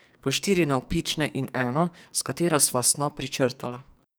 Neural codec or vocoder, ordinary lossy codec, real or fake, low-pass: codec, 44.1 kHz, 2.6 kbps, SNAC; none; fake; none